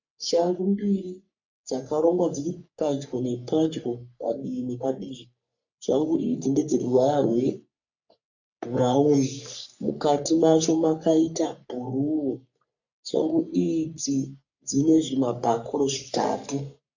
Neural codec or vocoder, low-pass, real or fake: codec, 44.1 kHz, 3.4 kbps, Pupu-Codec; 7.2 kHz; fake